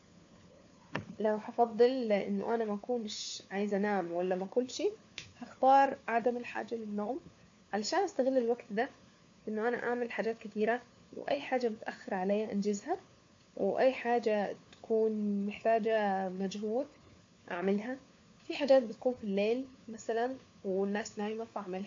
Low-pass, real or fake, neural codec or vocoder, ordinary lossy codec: 7.2 kHz; fake; codec, 16 kHz, 4 kbps, FunCodec, trained on LibriTTS, 50 frames a second; AAC, 48 kbps